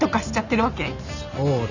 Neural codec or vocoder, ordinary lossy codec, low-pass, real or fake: none; none; 7.2 kHz; real